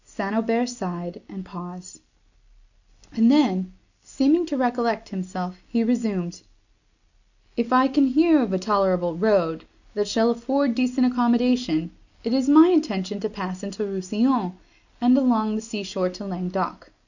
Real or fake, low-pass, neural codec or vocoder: real; 7.2 kHz; none